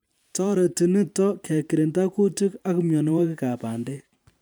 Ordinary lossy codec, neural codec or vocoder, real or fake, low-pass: none; vocoder, 44.1 kHz, 128 mel bands every 512 samples, BigVGAN v2; fake; none